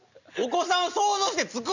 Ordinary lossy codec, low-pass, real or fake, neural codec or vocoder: none; 7.2 kHz; real; none